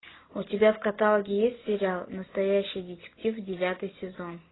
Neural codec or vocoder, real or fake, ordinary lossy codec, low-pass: none; real; AAC, 16 kbps; 7.2 kHz